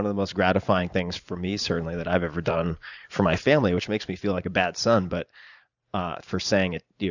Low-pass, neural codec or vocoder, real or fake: 7.2 kHz; vocoder, 44.1 kHz, 128 mel bands every 512 samples, BigVGAN v2; fake